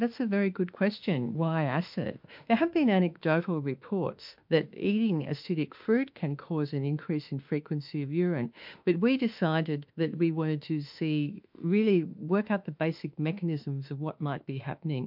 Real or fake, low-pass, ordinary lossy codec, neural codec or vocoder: fake; 5.4 kHz; MP3, 48 kbps; autoencoder, 48 kHz, 32 numbers a frame, DAC-VAE, trained on Japanese speech